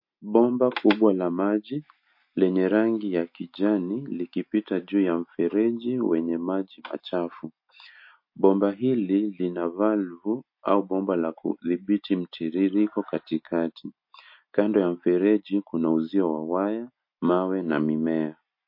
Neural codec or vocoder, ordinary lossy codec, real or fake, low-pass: none; MP3, 32 kbps; real; 5.4 kHz